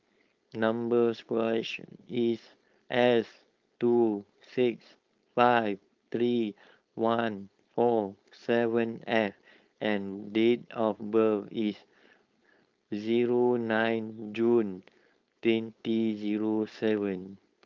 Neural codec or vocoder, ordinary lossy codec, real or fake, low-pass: codec, 16 kHz, 4.8 kbps, FACodec; Opus, 24 kbps; fake; 7.2 kHz